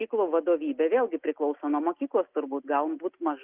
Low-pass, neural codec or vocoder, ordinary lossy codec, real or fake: 3.6 kHz; none; Opus, 32 kbps; real